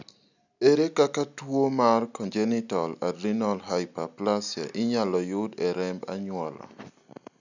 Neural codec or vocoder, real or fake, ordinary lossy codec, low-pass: none; real; none; 7.2 kHz